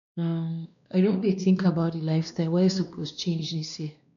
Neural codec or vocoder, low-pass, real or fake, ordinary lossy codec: codec, 16 kHz, 2 kbps, X-Codec, WavLM features, trained on Multilingual LibriSpeech; 7.2 kHz; fake; none